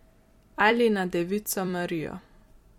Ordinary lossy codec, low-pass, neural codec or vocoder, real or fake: MP3, 64 kbps; 19.8 kHz; vocoder, 44.1 kHz, 128 mel bands every 256 samples, BigVGAN v2; fake